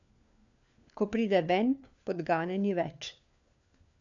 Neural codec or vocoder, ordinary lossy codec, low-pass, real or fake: codec, 16 kHz, 4 kbps, FunCodec, trained on LibriTTS, 50 frames a second; none; 7.2 kHz; fake